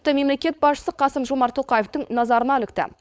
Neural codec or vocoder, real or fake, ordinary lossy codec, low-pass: codec, 16 kHz, 4.8 kbps, FACodec; fake; none; none